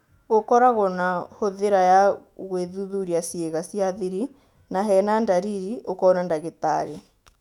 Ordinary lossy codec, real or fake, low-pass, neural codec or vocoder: none; fake; 19.8 kHz; autoencoder, 48 kHz, 128 numbers a frame, DAC-VAE, trained on Japanese speech